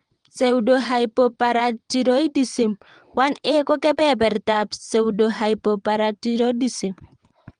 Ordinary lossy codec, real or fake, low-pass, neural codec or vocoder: Opus, 24 kbps; fake; 9.9 kHz; vocoder, 22.05 kHz, 80 mel bands, WaveNeXt